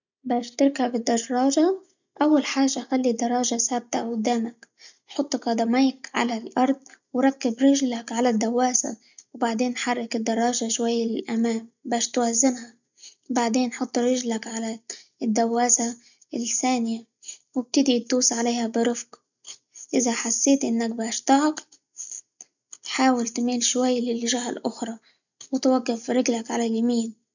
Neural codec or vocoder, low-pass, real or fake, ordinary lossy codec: none; 7.2 kHz; real; none